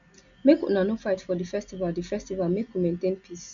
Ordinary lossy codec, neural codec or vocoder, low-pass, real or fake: none; none; 7.2 kHz; real